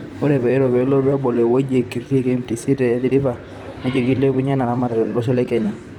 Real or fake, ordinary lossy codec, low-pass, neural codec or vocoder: fake; none; 19.8 kHz; vocoder, 44.1 kHz, 128 mel bands, Pupu-Vocoder